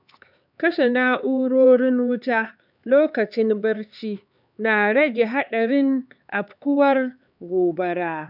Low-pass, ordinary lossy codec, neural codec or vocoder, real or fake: 5.4 kHz; none; codec, 16 kHz, 4 kbps, X-Codec, HuBERT features, trained on LibriSpeech; fake